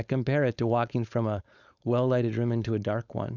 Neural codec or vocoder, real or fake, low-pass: codec, 16 kHz, 4.8 kbps, FACodec; fake; 7.2 kHz